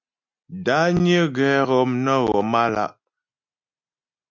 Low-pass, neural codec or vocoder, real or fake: 7.2 kHz; none; real